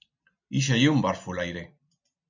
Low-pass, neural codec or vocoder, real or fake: 7.2 kHz; none; real